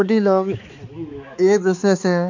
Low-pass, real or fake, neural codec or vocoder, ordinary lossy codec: 7.2 kHz; fake; codec, 16 kHz, 4 kbps, X-Codec, HuBERT features, trained on balanced general audio; none